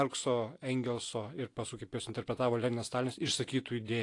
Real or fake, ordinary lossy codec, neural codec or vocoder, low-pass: real; AAC, 48 kbps; none; 10.8 kHz